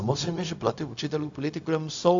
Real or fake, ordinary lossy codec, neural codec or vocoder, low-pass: fake; AAC, 64 kbps; codec, 16 kHz, 0.4 kbps, LongCat-Audio-Codec; 7.2 kHz